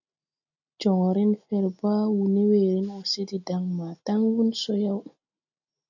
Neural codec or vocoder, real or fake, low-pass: none; real; 7.2 kHz